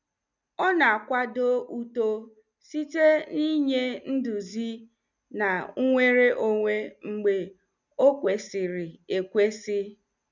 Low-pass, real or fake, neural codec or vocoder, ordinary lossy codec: 7.2 kHz; real; none; none